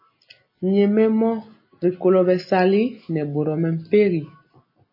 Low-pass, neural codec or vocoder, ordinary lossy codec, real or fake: 5.4 kHz; none; MP3, 24 kbps; real